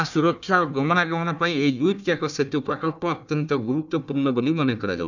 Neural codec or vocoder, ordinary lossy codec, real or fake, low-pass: codec, 16 kHz, 1 kbps, FunCodec, trained on Chinese and English, 50 frames a second; none; fake; 7.2 kHz